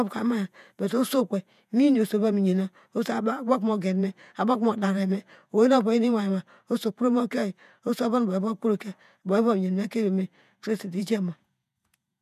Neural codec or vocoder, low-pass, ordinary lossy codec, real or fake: vocoder, 48 kHz, 128 mel bands, Vocos; 14.4 kHz; none; fake